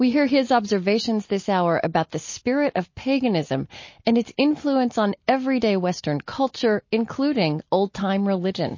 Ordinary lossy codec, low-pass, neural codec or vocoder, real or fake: MP3, 32 kbps; 7.2 kHz; none; real